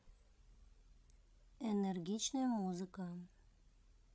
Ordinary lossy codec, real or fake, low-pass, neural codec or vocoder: none; fake; none; codec, 16 kHz, 16 kbps, FreqCodec, larger model